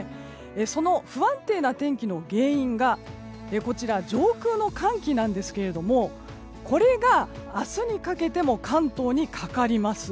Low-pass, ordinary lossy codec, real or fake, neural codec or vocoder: none; none; real; none